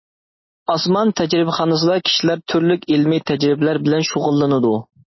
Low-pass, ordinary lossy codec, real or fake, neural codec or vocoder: 7.2 kHz; MP3, 24 kbps; real; none